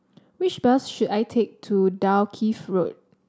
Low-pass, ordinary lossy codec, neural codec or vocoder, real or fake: none; none; none; real